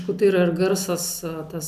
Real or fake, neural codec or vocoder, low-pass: real; none; 14.4 kHz